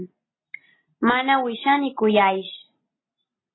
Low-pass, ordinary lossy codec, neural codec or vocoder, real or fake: 7.2 kHz; AAC, 16 kbps; none; real